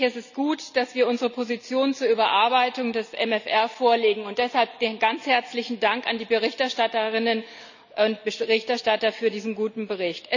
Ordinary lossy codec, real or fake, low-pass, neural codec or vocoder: none; real; 7.2 kHz; none